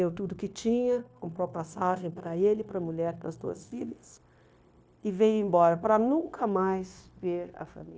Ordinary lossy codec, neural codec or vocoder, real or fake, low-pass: none; codec, 16 kHz, 0.9 kbps, LongCat-Audio-Codec; fake; none